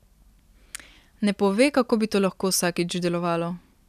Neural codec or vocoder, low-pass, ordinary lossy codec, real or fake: none; 14.4 kHz; none; real